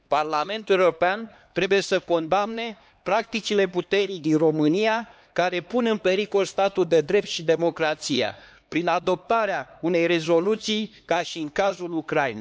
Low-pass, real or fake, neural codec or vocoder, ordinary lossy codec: none; fake; codec, 16 kHz, 2 kbps, X-Codec, HuBERT features, trained on LibriSpeech; none